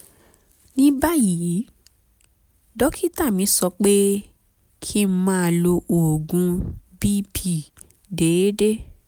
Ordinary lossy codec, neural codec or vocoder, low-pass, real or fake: none; none; none; real